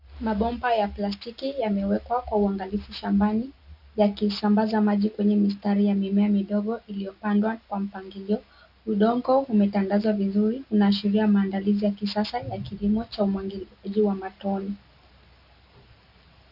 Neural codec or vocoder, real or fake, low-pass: none; real; 5.4 kHz